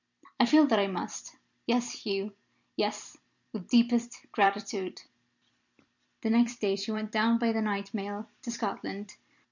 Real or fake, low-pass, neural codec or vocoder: fake; 7.2 kHz; vocoder, 44.1 kHz, 128 mel bands every 256 samples, BigVGAN v2